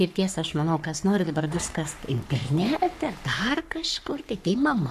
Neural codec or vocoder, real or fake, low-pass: codec, 44.1 kHz, 3.4 kbps, Pupu-Codec; fake; 14.4 kHz